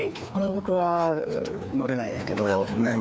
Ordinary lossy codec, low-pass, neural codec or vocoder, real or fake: none; none; codec, 16 kHz, 2 kbps, FreqCodec, larger model; fake